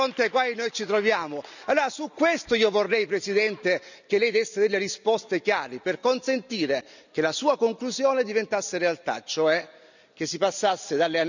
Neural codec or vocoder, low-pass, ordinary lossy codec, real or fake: none; 7.2 kHz; none; real